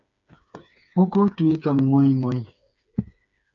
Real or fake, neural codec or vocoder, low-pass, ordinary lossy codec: fake; codec, 16 kHz, 4 kbps, FreqCodec, smaller model; 7.2 kHz; MP3, 96 kbps